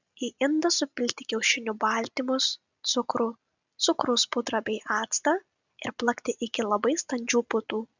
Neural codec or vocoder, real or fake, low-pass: none; real; 7.2 kHz